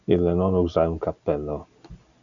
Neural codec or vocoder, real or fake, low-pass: none; real; 7.2 kHz